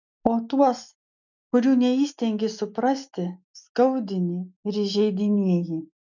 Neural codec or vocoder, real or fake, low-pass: none; real; 7.2 kHz